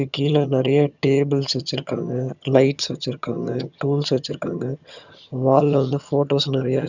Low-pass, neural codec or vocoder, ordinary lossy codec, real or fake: 7.2 kHz; vocoder, 22.05 kHz, 80 mel bands, HiFi-GAN; none; fake